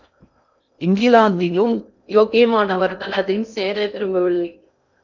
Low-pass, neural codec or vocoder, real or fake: 7.2 kHz; codec, 16 kHz in and 24 kHz out, 0.6 kbps, FocalCodec, streaming, 2048 codes; fake